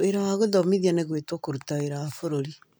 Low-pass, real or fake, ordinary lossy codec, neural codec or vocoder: none; real; none; none